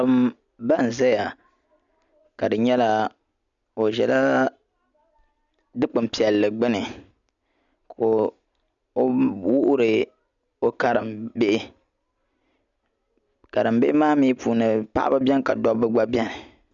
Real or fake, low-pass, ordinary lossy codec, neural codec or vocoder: real; 7.2 kHz; MP3, 96 kbps; none